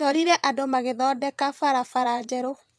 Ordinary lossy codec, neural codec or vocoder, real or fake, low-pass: none; vocoder, 22.05 kHz, 80 mel bands, Vocos; fake; none